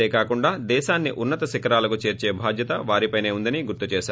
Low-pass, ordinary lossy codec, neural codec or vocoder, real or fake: none; none; none; real